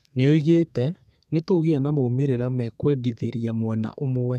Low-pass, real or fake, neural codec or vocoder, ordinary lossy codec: 14.4 kHz; fake; codec, 32 kHz, 1.9 kbps, SNAC; none